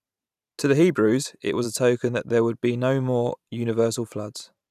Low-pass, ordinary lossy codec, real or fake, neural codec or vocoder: 14.4 kHz; none; fake; vocoder, 44.1 kHz, 128 mel bands every 512 samples, BigVGAN v2